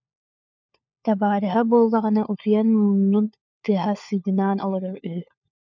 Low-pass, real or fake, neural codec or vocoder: 7.2 kHz; fake; codec, 16 kHz, 4 kbps, FunCodec, trained on LibriTTS, 50 frames a second